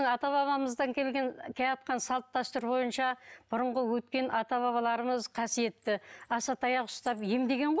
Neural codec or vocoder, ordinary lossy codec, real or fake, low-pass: none; none; real; none